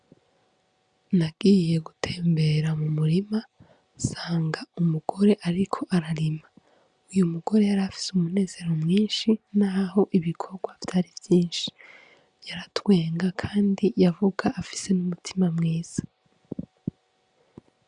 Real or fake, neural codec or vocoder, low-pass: real; none; 9.9 kHz